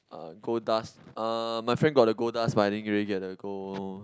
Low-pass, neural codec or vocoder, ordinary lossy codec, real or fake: none; none; none; real